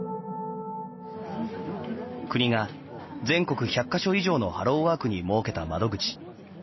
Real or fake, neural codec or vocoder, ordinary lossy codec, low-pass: real; none; MP3, 24 kbps; 7.2 kHz